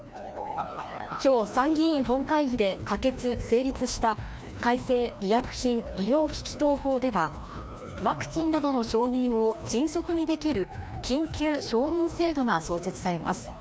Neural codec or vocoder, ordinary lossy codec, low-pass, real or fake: codec, 16 kHz, 1 kbps, FreqCodec, larger model; none; none; fake